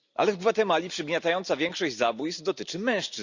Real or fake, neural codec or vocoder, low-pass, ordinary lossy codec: real; none; 7.2 kHz; Opus, 64 kbps